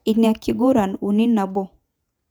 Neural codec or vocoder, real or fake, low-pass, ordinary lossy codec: vocoder, 48 kHz, 128 mel bands, Vocos; fake; 19.8 kHz; none